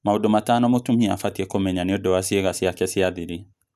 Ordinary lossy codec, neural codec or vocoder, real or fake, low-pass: none; none; real; 14.4 kHz